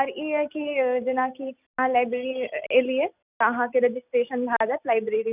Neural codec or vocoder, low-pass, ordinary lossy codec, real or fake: none; 3.6 kHz; none; real